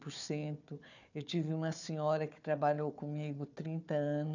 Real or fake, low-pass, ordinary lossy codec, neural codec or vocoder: fake; 7.2 kHz; none; codec, 16 kHz, 4 kbps, FunCodec, trained on Chinese and English, 50 frames a second